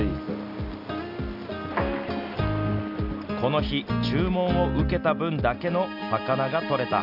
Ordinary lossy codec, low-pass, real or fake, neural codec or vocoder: none; 5.4 kHz; real; none